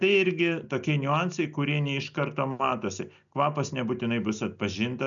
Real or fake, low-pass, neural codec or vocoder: real; 7.2 kHz; none